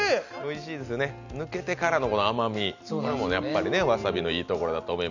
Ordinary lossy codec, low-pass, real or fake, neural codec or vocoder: none; 7.2 kHz; real; none